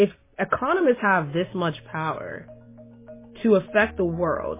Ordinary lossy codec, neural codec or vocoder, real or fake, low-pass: MP3, 16 kbps; none; real; 3.6 kHz